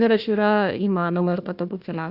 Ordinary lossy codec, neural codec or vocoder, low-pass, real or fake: Opus, 64 kbps; codec, 16 kHz, 1 kbps, FunCodec, trained on Chinese and English, 50 frames a second; 5.4 kHz; fake